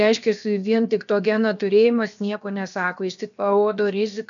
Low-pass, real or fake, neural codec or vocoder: 7.2 kHz; fake; codec, 16 kHz, 0.7 kbps, FocalCodec